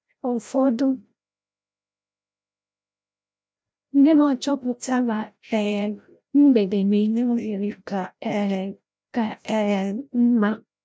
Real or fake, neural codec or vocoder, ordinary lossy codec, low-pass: fake; codec, 16 kHz, 0.5 kbps, FreqCodec, larger model; none; none